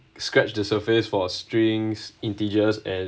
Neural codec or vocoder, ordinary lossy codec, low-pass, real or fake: none; none; none; real